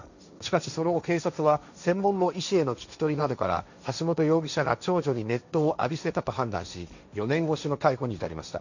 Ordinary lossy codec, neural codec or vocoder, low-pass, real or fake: none; codec, 16 kHz, 1.1 kbps, Voila-Tokenizer; 7.2 kHz; fake